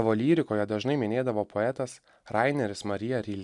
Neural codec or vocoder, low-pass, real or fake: none; 10.8 kHz; real